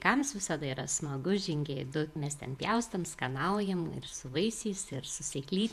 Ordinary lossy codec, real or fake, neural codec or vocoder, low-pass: MP3, 96 kbps; fake; vocoder, 48 kHz, 128 mel bands, Vocos; 14.4 kHz